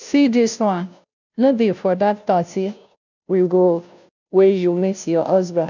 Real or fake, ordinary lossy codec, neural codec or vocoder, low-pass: fake; none; codec, 16 kHz, 0.5 kbps, FunCodec, trained on Chinese and English, 25 frames a second; 7.2 kHz